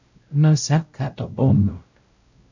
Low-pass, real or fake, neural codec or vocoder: 7.2 kHz; fake; codec, 16 kHz, 0.5 kbps, X-Codec, WavLM features, trained on Multilingual LibriSpeech